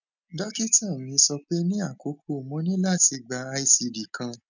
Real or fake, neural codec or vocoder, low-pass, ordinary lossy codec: real; none; 7.2 kHz; none